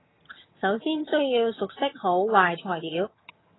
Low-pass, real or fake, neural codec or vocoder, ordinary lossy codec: 7.2 kHz; fake; vocoder, 22.05 kHz, 80 mel bands, HiFi-GAN; AAC, 16 kbps